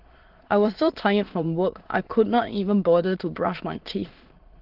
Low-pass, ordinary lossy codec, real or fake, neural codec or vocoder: 5.4 kHz; Opus, 16 kbps; fake; autoencoder, 22.05 kHz, a latent of 192 numbers a frame, VITS, trained on many speakers